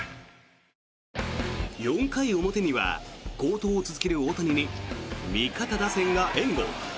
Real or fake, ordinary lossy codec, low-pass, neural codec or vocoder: real; none; none; none